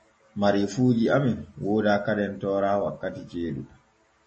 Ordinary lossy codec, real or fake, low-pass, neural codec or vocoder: MP3, 32 kbps; real; 9.9 kHz; none